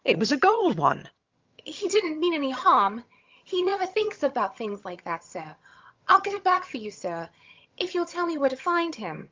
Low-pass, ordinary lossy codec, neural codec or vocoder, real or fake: 7.2 kHz; Opus, 24 kbps; vocoder, 22.05 kHz, 80 mel bands, HiFi-GAN; fake